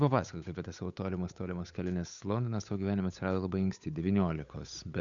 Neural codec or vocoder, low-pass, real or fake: codec, 16 kHz, 8 kbps, FunCodec, trained on Chinese and English, 25 frames a second; 7.2 kHz; fake